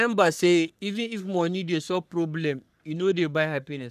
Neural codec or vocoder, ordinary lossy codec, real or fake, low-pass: codec, 44.1 kHz, 3.4 kbps, Pupu-Codec; none; fake; 14.4 kHz